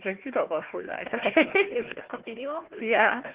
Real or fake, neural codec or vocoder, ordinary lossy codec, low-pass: fake; codec, 16 kHz, 1 kbps, FunCodec, trained on Chinese and English, 50 frames a second; Opus, 16 kbps; 3.6 kHz